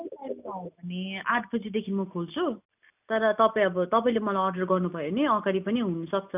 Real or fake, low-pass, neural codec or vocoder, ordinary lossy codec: real; 3.6 kHz; none; none